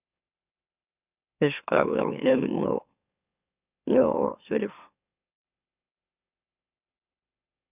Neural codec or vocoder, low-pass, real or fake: autoencoder, 44.1 kHz, a latent of 192 numbers a frame, MeloTTS; 3.6 kHz; fake